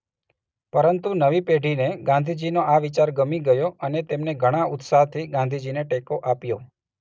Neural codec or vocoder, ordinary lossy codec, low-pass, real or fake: none; none; none; real